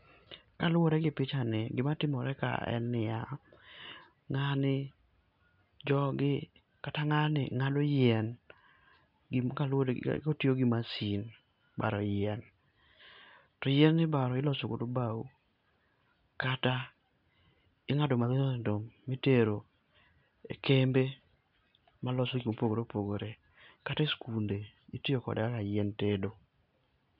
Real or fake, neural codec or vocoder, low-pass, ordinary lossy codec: real; none; 5.4 kHz; none